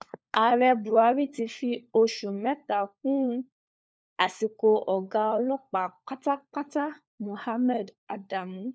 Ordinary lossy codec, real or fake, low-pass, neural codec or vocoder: none; fake; none; codec, 16 kHz, 4 kbps, FunCodec, trained on LibriTTS, 50 frames a second